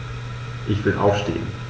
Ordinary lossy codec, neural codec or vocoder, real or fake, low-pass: none; none; real; none